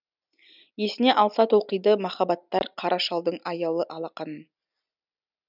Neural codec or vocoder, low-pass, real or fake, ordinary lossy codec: none; 5.4 kHz; real; none